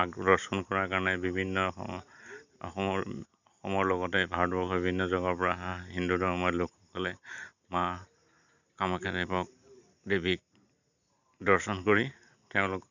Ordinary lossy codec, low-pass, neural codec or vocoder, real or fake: none; 7.2 kHz; none; real